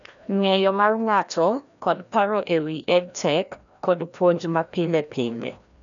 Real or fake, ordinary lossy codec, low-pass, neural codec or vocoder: fake; none; 7.2 kHz; codec, 16 kHz, 1 kbps, FreqCodec, larger model